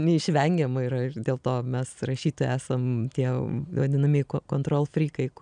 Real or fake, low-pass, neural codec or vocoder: real; 9.9 kHz; none